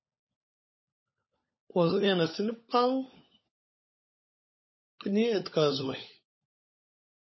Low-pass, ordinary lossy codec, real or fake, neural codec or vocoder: 7.2 kHz; MP3, 24 kbps; fake; codec, 16 kHz, 16 kbps, FunCodec, trained on LibriTTS, 50 frames a second